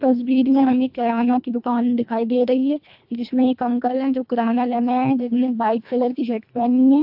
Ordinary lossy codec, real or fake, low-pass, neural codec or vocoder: AAC, 48 kbps; fake; 5.4 kHz; codec, 24 kHz, 1.5 kbps, HILCodec